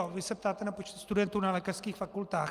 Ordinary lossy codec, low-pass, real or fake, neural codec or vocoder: Opus, 32 kbps; 14.4 kHz; real; none